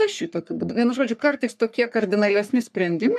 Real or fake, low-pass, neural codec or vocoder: fake; 14.4 kHz; codec, 44.1 kHz, 3.4 kbps, Pupu-Codec